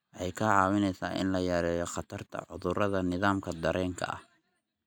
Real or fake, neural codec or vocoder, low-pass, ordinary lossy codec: real; none; 19.8 kHz; none